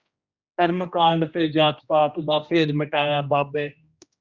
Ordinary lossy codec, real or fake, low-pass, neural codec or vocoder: Opus, 64 kbps; fake; 7.2 kHz; codec, 16 kHz, 1 kbps, X-Codec, HuBERT features, trained on general audio